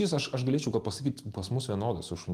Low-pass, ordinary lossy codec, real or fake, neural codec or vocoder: 14.4 kHz; Opus, 24 kbps; real; none